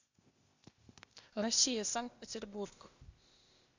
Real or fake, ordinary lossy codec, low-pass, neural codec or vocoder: fake; Opus, 64 kbps; 7.2 kHz; codec, 16 kHz, 0.8 kbps, ZipCodec